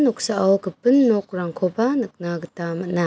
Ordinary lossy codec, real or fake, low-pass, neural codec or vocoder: none; real; none; none